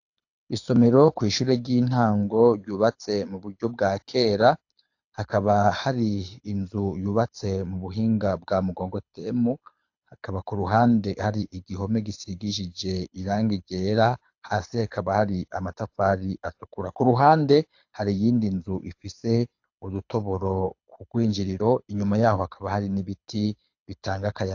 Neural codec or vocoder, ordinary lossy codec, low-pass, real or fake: codec, 24 kHz, 6 kbps, HILCodec; AAC, 48 kbps; 7.2 kHz; fake